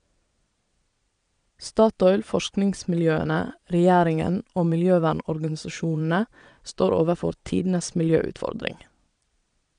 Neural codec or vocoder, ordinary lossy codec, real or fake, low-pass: vocoder, 22.05 kHz, 80 mel bands, Vocos; none; fake; 9.9 kHz